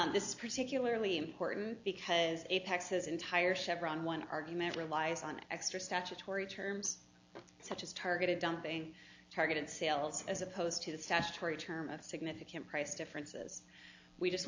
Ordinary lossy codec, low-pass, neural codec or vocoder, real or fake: AAC, 48 kbps; 7.2 kHz; none; real